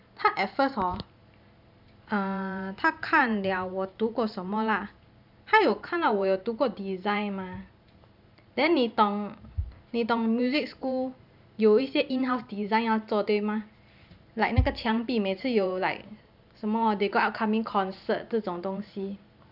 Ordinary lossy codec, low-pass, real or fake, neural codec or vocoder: none; 5.4 kHz; fake; vocoder, 44.1 kHz, 128 mel bands every 512 samples, BigVGAN v2